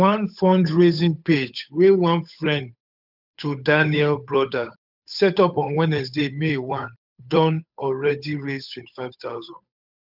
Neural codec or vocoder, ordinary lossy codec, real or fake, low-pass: codec, 16 kHz, 8 kbps, FunCodec, trained on Chinese and English, 25 frames a second; none; fake; 5.4 kHz